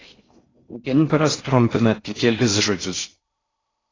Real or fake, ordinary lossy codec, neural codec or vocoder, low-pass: fake; AAC, 32 kbps; codec, 16 kHz in and 24 kHz out, 0.6 kbps, FocalCodec, streaming, 4096 codes; 7.2 kHz